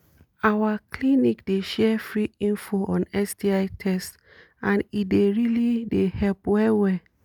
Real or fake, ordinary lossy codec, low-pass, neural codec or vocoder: real; none; 19.8 kHz; none